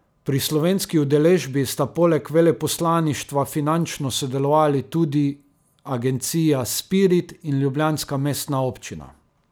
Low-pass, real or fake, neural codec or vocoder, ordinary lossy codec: none; real; none; none